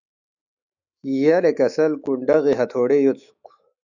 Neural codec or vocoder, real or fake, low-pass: autoencoder, 48 kHz, 128 numbers a frame, DAC-VAE, trained on Japanese speech; fake; 7.2 kHz